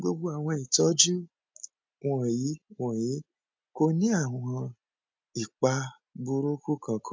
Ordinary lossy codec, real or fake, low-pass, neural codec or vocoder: none; real; none; none